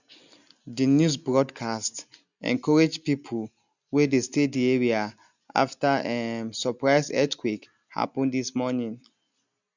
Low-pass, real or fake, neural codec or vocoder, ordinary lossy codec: 7.2 kHz; real; none; none